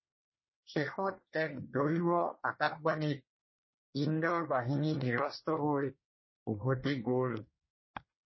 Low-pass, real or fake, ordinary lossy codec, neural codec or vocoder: 7.2 kHz; fake; MP3, 24 kbps; codec, 24 kHz, 1 kbps, SNAC